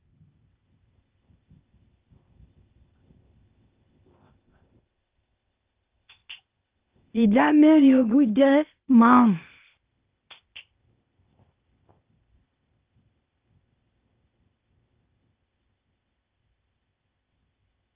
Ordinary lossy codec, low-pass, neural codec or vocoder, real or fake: Opus, 32 kbps; 3.6 kHz; codec, 16 kHz, 0.8 kbps, ZipCodec; fake